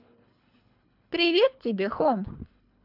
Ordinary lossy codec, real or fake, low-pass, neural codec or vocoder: none; fake; 5.4 kHz; codec, 24 kHz, 3 kbps, HILCodec